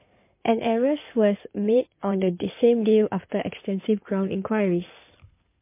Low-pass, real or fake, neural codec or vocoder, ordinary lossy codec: 3.6 kHz; fake; codec, 16 kHz in and 24 kHz out, 2.2 kbps, FireRedTTS-2 codec; MP3, 24 kbps